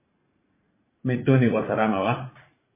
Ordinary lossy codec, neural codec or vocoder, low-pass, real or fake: MP3, 16 kbps; vocoder, 44.1 kHz, 80 mel bands, Vocos; 3.6 kHz; fake